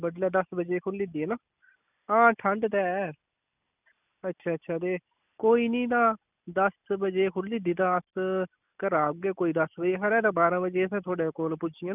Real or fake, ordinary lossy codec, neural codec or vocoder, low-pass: real; none; none; 3.6 kHz